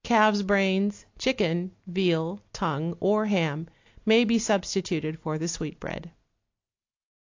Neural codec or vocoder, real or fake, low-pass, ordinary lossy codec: none; real; 7.2 kHz; AAC, 48 kbps